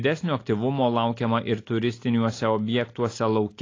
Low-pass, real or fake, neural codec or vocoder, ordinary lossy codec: 7.2 kHz; real; none; AAC, 32 kbps